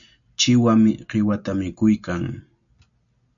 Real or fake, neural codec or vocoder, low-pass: real; none; 7.2 kHz